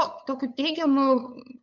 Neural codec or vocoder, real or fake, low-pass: codec, 16 kHz, 8 kbps, FunCodec, trained on LibriTTS, 25 frames a second; fake; 7.2 kHz